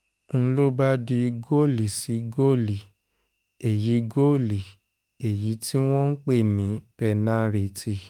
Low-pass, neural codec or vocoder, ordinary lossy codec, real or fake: 19.8 kHz; autoencoder, 48 kHz, 32 numbers a frame, DAC-VAE, trained on Japanese speech; Opus, 32 kbps; fake